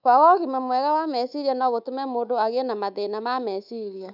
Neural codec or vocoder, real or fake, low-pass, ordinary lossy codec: codec, 24 kHz, 3.1 kbps, DualCodec; fake; 5.4 kHz; none